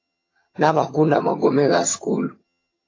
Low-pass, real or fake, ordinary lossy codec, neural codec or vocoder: 7.2 kHz; fake; AAC, 32 kbps; vocoder, 22.05 kHz, 80 mel bands, HiFi-GAN